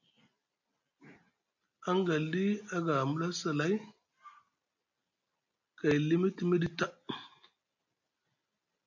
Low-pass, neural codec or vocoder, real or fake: 7.2 kHz; none; real